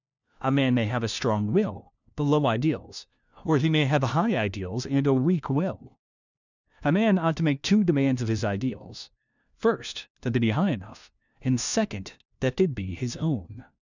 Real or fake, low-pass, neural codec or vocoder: fake; 7.2 kHz; codec, 16 kHz, 1 kbps, FunCodec, trained on LibriTTS, 50 frames a second